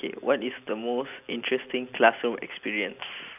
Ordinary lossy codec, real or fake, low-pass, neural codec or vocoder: none; real; 3.6 kHz; none